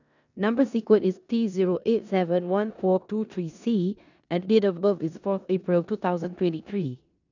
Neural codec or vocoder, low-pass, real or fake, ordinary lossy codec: codec, 16 kHz in and 24 kHz out, 0.9 kbps, LongCat-Audio-Codec, four codebook decoder; 7.2 kHz; fake; none